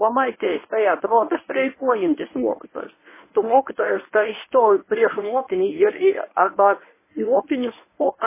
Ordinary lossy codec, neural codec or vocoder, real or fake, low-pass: MP3, 16 kbps; codec, 24 kHz, 0.9 kbps, WavTokenizer, medium speech release version 1; fake; 3.6 kHz